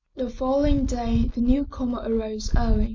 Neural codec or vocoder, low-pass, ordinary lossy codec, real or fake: none; 7.2 kHz; MP3, 64 kbps; real